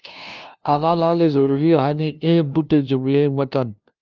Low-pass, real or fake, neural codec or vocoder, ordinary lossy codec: 7.2 kHz; fake; codec, 16 kHz, 0.5 kbps, FunCodec, trained on LibriTTS, 25 frames a second; Opus, 24 kbps